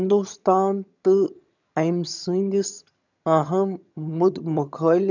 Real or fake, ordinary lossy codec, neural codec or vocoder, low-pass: fake; none; vocoder, 22.05 kHz, 80 mel bands, HiFi-GAN; 7.2 kHz